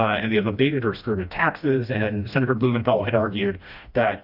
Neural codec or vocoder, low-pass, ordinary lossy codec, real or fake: codec, 16 kHz, 1 kbps, FreqCodec, smaller model; 5.4 kHz; Opus, 64 kbps; fake